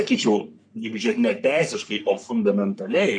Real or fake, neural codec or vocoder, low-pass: fake; codec, 44.1 kHz, 3.4 kbps, Pupu-Codec; 9.9 kHz